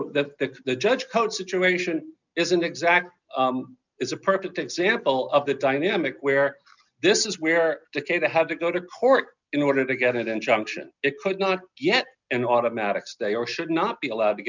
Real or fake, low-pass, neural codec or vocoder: real; 7.2 kHz; none